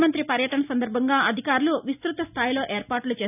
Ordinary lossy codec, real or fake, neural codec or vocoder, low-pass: none; real; none; 3.6 kHz